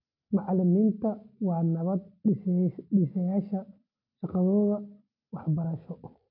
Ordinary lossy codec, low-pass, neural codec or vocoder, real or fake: none; 5.4 kHz; none; real